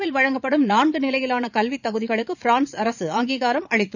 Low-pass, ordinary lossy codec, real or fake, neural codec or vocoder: 7.2 kHz; none; fake; vocoder, 22.05 kHz, 80 mel bands, Vocos